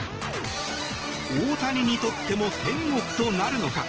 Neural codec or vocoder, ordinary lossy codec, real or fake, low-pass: none; Opus, 16 kbps; real; 7.2 kHz